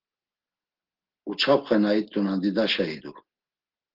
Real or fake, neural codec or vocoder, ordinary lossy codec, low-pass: real; none; Opus, 16 kbps; 5.4 kHz